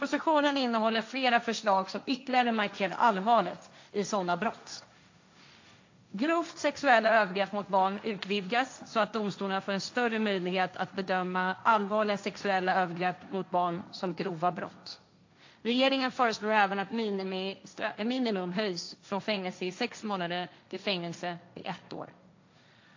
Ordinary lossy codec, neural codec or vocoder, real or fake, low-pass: AAC, 48 kbps; codec, 16 kHz, 1.1 kbps, Voila-Tokenizer; fake; 7.2 kHz